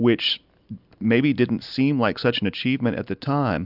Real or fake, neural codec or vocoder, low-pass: real; none; 5.4 kHz